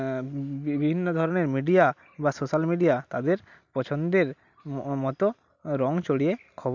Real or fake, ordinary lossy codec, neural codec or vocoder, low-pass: real; none; none; 7.2 kHz